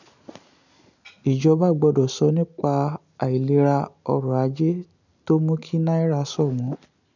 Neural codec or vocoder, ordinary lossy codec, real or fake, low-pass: none; none; real; 7.2 kHz